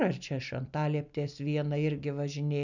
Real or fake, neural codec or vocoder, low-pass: real; none; 7.2 kHz